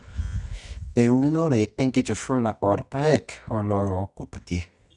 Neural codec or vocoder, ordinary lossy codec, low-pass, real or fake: codec, 24 kHz, 0.9 kbps, WavTokenizer, medium music audio release; none; 10.8 kHz; fake